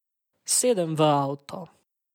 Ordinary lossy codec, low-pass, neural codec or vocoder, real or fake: MP3, 96 kbps; 19.8 kHz; none; real